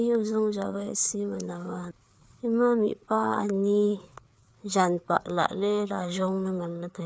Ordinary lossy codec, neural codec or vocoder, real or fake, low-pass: none; codec, 16 kHz, 4 kbps, FreqCodec, larger model; fake; none